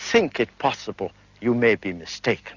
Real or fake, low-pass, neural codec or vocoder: real; 7.2 kHz; none